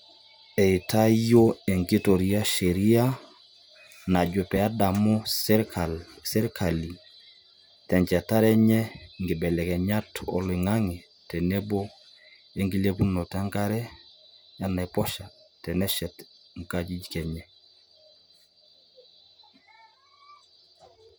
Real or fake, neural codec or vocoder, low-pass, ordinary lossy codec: real; none; none; none